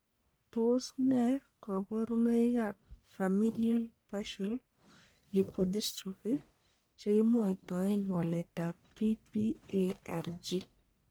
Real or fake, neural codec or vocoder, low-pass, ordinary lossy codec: fake; codec, 44.1 kHz, 1.7 kbps, Pupu-Codec; none; none